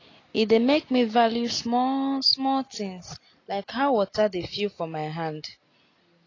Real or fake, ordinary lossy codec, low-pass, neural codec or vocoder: real; AAC, 32 kbps; 7.2 kHz; none